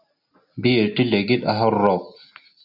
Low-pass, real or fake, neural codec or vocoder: 5.4 kHz; real; none